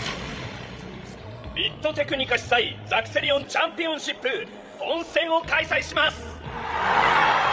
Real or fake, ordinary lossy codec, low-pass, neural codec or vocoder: fake; none; none; codec, 16 kHz, 16 kbps, FreqCodec, larger model